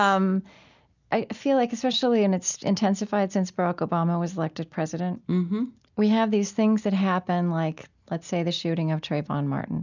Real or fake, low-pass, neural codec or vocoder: real; 7.2 kHz; none